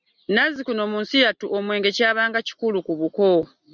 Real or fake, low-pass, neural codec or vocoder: real; 7.2 kHz; none